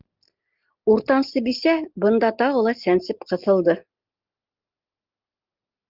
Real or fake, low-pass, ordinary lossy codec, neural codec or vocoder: real; 5.4 kHz; Opus, 24 kbps; none